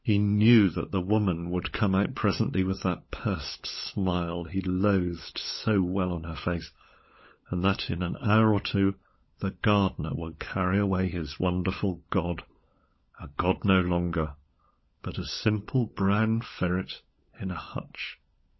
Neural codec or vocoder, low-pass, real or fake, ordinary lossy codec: codec, 16 kHz, 16 kbps, FunCodec, trained on LibriTTS, 50 frames a second; 7.2 kHz; fake; MP3, 24 kbps